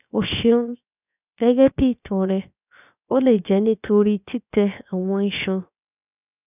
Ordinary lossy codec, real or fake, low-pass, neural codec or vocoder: none; fake; 3.6 kHz; codec, 16 kHz, 0.7 kbps, FocalCodec